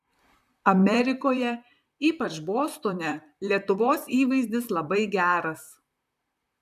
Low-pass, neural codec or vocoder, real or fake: 14.4 kHz; vocoder, 44.1 kHz, 128 mel bands, Pupu-Vocoder; fake